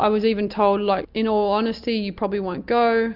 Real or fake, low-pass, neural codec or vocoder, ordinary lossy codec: real; 5.4 kHz; none; Opus, 64 kbps